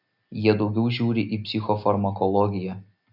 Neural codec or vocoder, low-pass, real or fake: none; 5.4 kHz; real